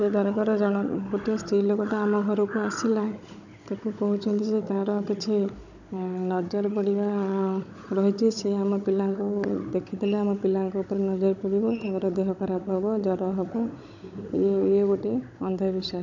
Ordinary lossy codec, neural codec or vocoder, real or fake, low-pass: none; codec, 16 kHz, 16 kbps, FunCodec, trained on Chinese and English, 50 frames a second; fake; 7.2 kHz